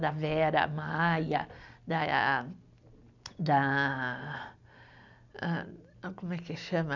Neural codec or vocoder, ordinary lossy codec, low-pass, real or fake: none; none; 7.2 kHz; real